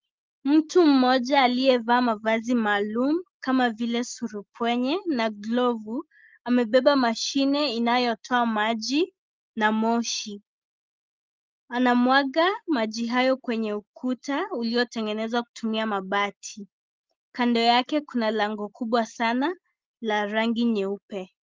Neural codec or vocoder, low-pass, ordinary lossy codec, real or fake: none; 7.2 kHz; Opus, 32 kbps; real